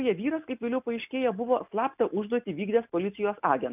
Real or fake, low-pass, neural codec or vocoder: real; 3.6 kHz; none